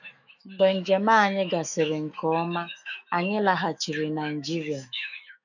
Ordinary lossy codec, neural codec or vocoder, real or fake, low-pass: none; autoencoder, 48 kHz, 128 numbers a frame, DAC-VAE, trained on Japanese speech; fake; 7.2 kHz